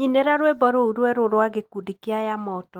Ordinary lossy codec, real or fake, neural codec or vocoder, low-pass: Opus, 24 kbps; real; none; 19.8 kHz